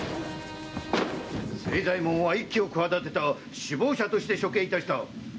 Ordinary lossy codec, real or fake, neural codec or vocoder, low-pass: none; real; none; none